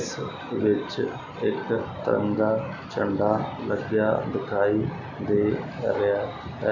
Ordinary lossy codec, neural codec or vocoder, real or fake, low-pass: none; none; real; 7.2 kHz